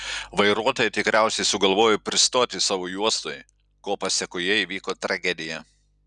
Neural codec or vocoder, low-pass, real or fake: none; 9.9 kHz; real